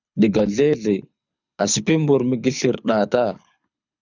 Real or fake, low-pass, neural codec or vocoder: fake; 7.2 kHz; codec, 24 kHz, 6 kbps, HILCodec